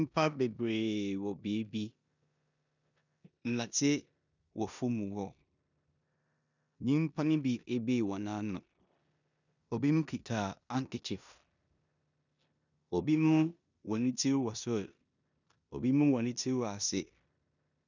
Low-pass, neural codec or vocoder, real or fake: 7.2 kHz; codec, 16 kHz in and 24 kHz out, 0.9 kbps, LongCat-Audio-Codec, four codebook decoder; fake